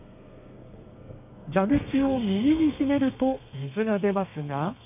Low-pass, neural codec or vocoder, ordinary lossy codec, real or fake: 3.6 kHz; codec, 32 kHz, 1.9 kbps, SNAC; none; fake